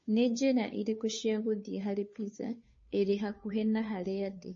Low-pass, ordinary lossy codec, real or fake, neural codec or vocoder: 7.2 kHz; MP3, 32 kbps; fake; codec, 16 kHz, 2 kbps, FunCodec, trained on Chinese and English, 25 frames a second